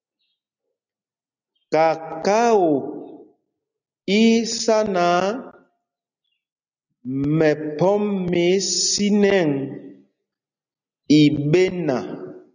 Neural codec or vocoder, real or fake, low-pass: none; real; 7.2 kHz